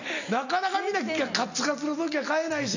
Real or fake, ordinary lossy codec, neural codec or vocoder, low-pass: real; none; none; 7.2 kHz